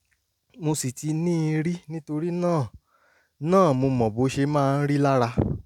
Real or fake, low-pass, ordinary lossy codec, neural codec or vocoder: real; 19.8 kHz; none; none